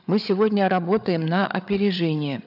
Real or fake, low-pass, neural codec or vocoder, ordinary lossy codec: fake; 5.4 kHz; codec, 16 kHz, 8 kbps, FreqCodec, larger model; none